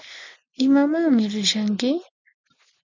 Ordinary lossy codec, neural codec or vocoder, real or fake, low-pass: MP3, 64 kbps; none; real; 7.2 kHz